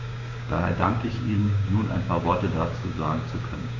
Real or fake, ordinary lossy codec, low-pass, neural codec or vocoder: fake; MP3, 48 kbps; 7.2 kHz; codec, 16 kHz, 6 kbps, DAC